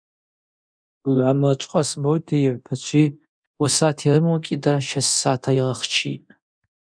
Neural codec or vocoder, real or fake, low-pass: codec, 24 kHz, 0.9 kbps, DualCodec; fake; 9.9 kHz